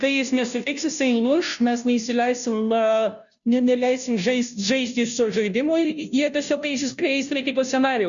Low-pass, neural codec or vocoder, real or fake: 7.2 kHz; codec, 16 kHz, 0.5 kbps, FunCodec, trained on Chinese and English, 25 frames a second; fake